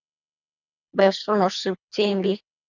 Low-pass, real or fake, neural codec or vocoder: 7.2 kHz; fake; codec, 24 kHz, 1.5 kbps, HILCodec